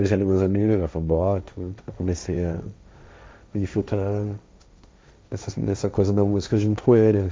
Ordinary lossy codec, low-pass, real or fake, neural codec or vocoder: none; none; fake; codec, 16 kHz, 1.1 kbps, Voila-Tokenizer